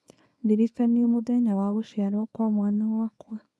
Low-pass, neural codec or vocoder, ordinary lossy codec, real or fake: none; codec, 24 kHz, 0.9 kbps, WavTokenizer, small release; none; fake